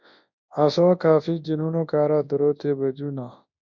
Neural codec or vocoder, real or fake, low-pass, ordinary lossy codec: codec, 24 kHz, 0.9 kbps, WavTokenizer, large speech release; fake; 7.2 kHz; MP3, 48 kbps